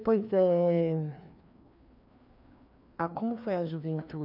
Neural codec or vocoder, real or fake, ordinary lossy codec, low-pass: codec, 16 kHz, 2 kbps, FreqCodec, larger model; fake; none; 5.4 kHz